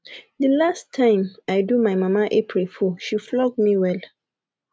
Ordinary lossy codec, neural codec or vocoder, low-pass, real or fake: none; none; none; real